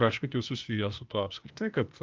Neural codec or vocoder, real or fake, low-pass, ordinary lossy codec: codec, 16 kHz, 0.8 kbps, ZipCodec; fake; 7.2 kHz; Opus, 24 kbps